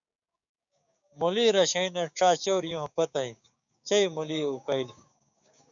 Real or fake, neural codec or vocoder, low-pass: fake; codec, 16 kHz, 6 kbps, DAC; 7.2 kHz